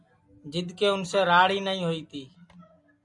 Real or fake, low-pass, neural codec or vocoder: real; 10.8 kHz; none